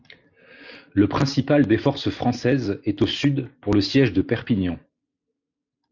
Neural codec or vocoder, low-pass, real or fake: none; 7.2 kHz; real